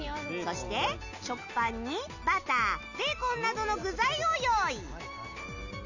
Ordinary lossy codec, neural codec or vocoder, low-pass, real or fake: none; none; 7.2 kHz; real